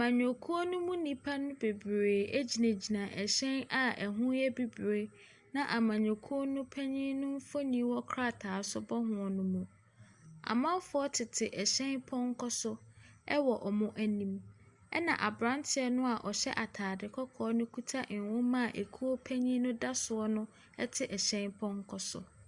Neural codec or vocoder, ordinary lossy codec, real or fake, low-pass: none; Opus, 64 kbps; real; 10.8 kHz